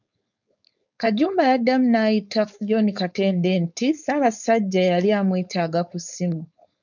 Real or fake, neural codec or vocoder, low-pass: fake; codec, 16 kHz, 4.8 kbps, FACodec; 7.2 kHz